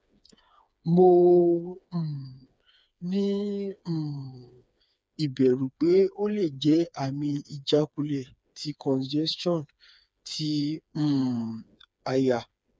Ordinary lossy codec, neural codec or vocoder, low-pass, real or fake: none; codec, 16 kHz, 4 kbps, FreqCodec, smaller model; none; fake